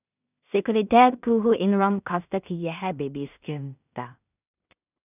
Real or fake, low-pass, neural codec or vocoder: fake; 3.6 kHz; codec, 16 kHz in and 24 kHz out, 0.4 kbps, LongCat-Audio-Codec, two codebook decoder